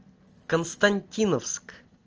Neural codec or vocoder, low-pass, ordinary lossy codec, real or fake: none; 7.2 kHz; Opus, 24 kbps; real